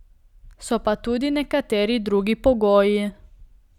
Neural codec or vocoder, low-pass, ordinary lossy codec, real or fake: none; 19.8 kHz; none; real